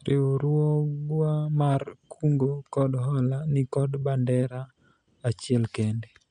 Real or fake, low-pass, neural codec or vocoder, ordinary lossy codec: real; 9.9 kHz; none; none